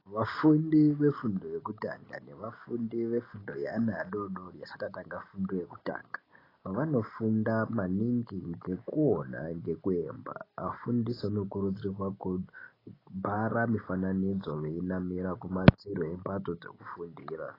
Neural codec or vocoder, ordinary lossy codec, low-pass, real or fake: none; AAC, 24 kbps; 5.4 kHz; real